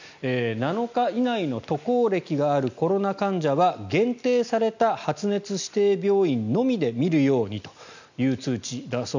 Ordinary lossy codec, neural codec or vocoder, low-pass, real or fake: none; none; 7.2 kHz; real